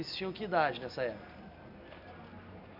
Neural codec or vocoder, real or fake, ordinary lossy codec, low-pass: none; real; none; 5.4 kHz